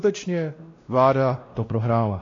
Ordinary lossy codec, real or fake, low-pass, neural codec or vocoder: AAC, 32 kbps; fake; 7.2 kHz; codec, 16 kHz, 1 kbps, X-Codec, WavLM features, trained on Multilingual LibriSpeech